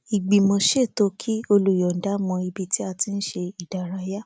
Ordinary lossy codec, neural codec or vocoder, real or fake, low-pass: none; none; real; none